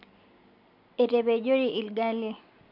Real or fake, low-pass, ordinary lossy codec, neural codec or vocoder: real; 5.4 kHz; none; none